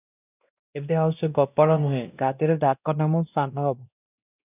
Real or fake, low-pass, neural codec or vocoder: fake; 3.6 kHz; codec, 16 kHz, 1 kbps, X-Codec, WavLM features, trained on Multilingual LibriSpeech